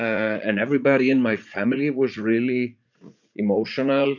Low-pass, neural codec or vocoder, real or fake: 7.2 kHz; vocoder, 44.1 kHz, 80 mel bands, Vocos; fake